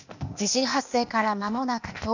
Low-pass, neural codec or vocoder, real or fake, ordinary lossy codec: 7.2 kHz; codec, 16 kHz, 0.8 kbps, ZipCodec; fake; none